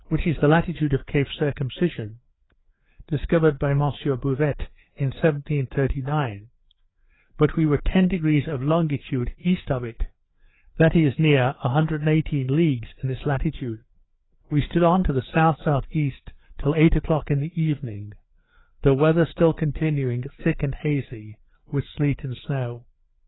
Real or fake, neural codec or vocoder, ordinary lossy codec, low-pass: fake; codec, 16 kHz, 4 kbps, FreqCodec, larger model; AAC, 16 kbps; 7.2 kHz